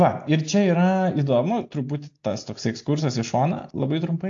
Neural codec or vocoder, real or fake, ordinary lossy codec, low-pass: none; real; AAC, 48 kbps; 7.2 kHz